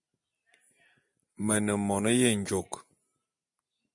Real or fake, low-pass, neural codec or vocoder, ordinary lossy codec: real; 10.8 kHz; none; MP3, 48 kbps